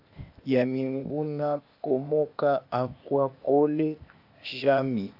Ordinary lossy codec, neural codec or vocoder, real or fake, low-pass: AAC, 48 kbps; codec, 16 kHz, 0.8 kbps, ZipCodec; fake; 5.4 kHz